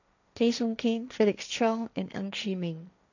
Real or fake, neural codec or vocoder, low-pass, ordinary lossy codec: fake; codec, 16 kHz, 1.1 kbps, Voila-Tokenizer; 7.2 kHz; none